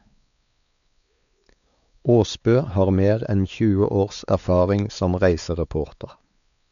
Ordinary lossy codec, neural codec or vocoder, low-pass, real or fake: none; codec, 16 kHz, 4 kbps, X-Codec, WavLM features, trained on Multilingual LibriSpeech; 7.2 kHz; fake